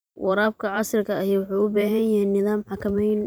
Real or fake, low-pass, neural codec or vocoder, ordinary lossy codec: fake; none; vocoder, 44.1 kHz, 128 mel bands every 512 samples, BigVGAN v2; none